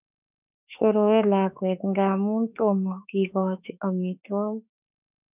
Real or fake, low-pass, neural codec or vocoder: fake; 3.6 kHz; autoencoder, 48 kHz, 32 numbers a frame, DAC-VAE, trained on Japanese speech